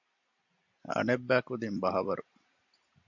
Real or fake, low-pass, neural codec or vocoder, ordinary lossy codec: real; 7.2 kHz; none; MP3, 64 kbps